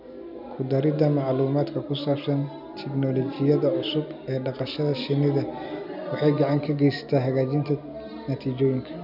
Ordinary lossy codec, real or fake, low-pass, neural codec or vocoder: none; real; 5.4 kHz; none